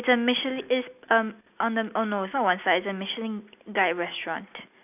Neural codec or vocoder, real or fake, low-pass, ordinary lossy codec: none; real; 3.6 kHz; none